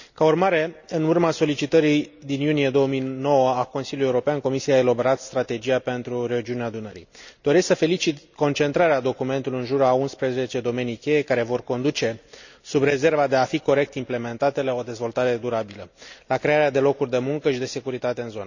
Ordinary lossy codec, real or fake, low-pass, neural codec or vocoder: none; real; 7.2 kHz; none